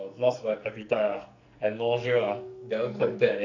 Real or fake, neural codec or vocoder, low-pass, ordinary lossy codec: fake; codec, 44.1 kHz, 2.6 kbps, SNAC; 7.2 kHz; none